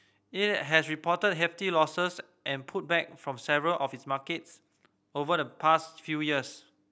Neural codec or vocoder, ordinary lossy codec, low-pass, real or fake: none; none; none; real